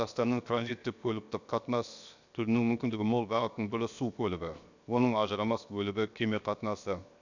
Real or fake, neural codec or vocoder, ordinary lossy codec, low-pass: fake; codec, 16 kHz, 0.7 kbps, FocalCodec; none; 7.2 kHz